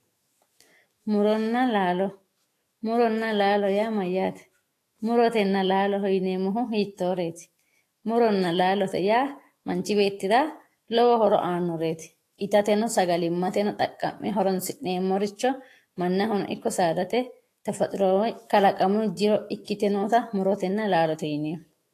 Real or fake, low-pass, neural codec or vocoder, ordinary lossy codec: fake; 14.4 kHz; autoencoder, 48 kHz, 128 numbers a frame, DAC-VAE, trained on Japanese speech; AAC, 48 kbps